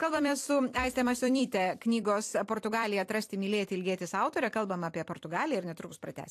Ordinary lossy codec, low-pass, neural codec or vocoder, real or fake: AAC, 64 kbps; 14.4 kHz; vocoder, 44.1 kHz, 128 mel bands every 256 samples, BigVGAN v2; fake